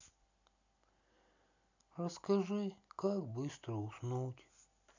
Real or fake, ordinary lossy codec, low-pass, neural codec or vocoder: real; none; 7.2 kHz; none